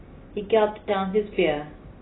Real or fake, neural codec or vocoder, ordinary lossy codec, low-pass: real; none; AAC, 16 kbps; 7.2 kHz